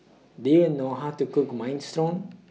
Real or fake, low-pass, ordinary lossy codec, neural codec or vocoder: real; none; none; none